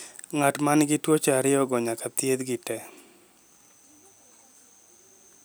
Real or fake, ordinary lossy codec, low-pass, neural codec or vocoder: real; none; none; none